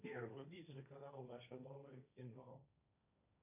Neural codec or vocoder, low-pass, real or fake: codec, 16 kHz, 1.1 kbps, Voila-Tokenizer; 3.6 kHz; fake